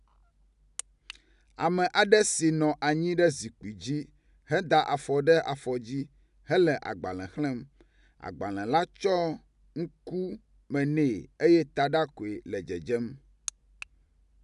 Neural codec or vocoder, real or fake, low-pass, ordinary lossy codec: none; real; 10.8 kHz; none